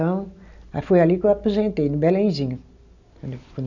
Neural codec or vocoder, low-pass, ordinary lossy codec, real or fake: none; 7.2 kHz; none; real